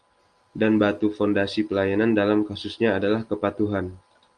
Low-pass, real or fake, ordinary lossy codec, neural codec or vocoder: 9.9 kHz; real; Opus, 32 kbps; none